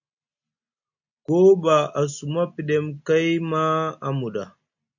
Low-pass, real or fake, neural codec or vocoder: 7.2 kHz; real; none